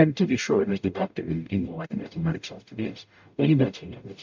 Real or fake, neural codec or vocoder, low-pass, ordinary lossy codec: fake; codec, 44.1 kHz, 0.9 kbps, DAC; 7.2 kHz; MP3, 64 kbps